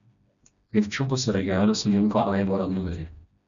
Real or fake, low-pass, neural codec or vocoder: fake; 7.2 kHz; codec, 16 kHz, 1 kbps, FreqCodec, smaller model